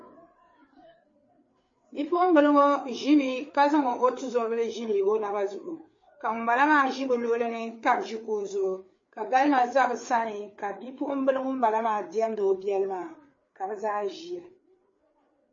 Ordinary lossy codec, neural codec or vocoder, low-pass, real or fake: MP3, 32 kbps; codec, 16 kHz, 4 kbps, FreqCodec, larger model; 7.2 kHz; fake